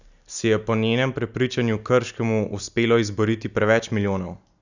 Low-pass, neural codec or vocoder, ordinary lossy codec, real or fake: 7.2 kHz; none; none; real